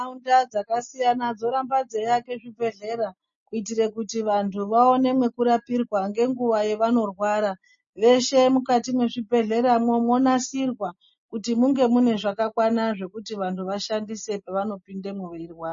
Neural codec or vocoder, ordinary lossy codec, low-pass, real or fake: none; MP3, 32 kbps; 7.2 kHz; real